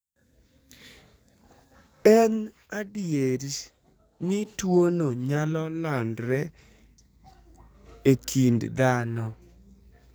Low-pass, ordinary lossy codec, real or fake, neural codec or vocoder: none; none; fake; codec, 44.1 kHz, 2.6 kbps, SNAC